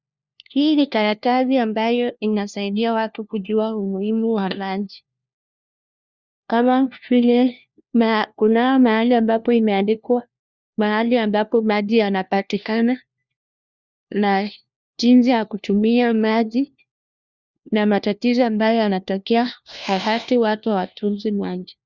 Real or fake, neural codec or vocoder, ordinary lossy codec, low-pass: fake; codec, 16 kHz, 1 kbps, FunCodec, trained on LibriTTS, 50 frames a second; Opus, 64 kbps; 7.2 kHz